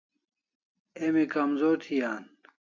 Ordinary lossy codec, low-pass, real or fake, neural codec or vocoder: AAC, 48 kbps; 7.2 kHz; real; none